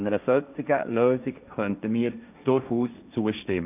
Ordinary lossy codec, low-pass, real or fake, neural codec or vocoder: none; 3.6 kHz; fake; codec, 16 kHz, 1.1 kbps, Voila-Tokenizer